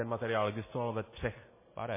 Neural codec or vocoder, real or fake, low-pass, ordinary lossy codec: none; real; 3.6 kHz; MP3, 16 kbps